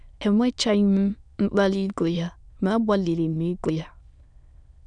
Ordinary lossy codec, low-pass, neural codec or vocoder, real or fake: none; 9.9 kHz; autoencoder, 22.05 kHz, a latent of 192 numbers a frame, VITS, trained on many speakers; fake